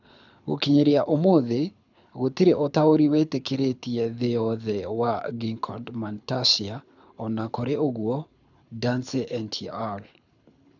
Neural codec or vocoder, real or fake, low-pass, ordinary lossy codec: codec, 24 kHz, 6 kbps, HILCodec; fake; 7.2 kHz; none